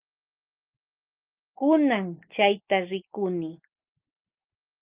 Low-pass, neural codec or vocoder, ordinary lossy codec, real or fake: 3.6 kHz; none; Opus, 24 kbps; real